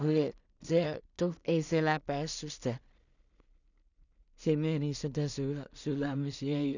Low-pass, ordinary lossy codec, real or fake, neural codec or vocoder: 7.2 kHz; none; fake; codec, 16 kHz in and 24 kHz out, 0.4 kbps, LongCat-Audio-Codec, two codebook decoder